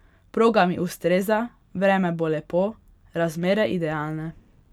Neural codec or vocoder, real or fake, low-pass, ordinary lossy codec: none; real; 19.8 kHz; none